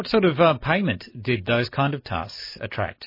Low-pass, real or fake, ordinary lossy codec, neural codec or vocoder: 5.4 kHz; real; MP3, 24 kbps; none